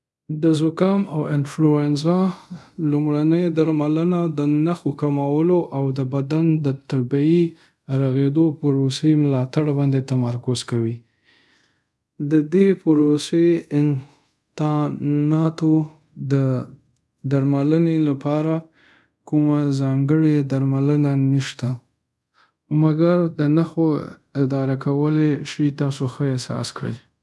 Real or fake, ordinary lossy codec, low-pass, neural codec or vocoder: fake; none; none; codec, 24 kHz, 0.5 kbps, DualCodec